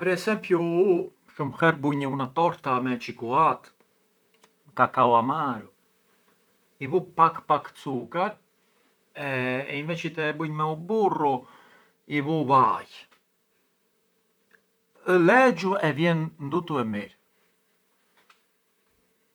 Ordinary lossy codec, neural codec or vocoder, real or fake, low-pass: none; vocoder, 44.1 kHz, 128 mel bands, Pupu-Vocoder; fake; none